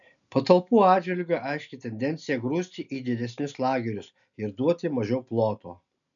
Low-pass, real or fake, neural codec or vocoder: 7.2 kHz; real; none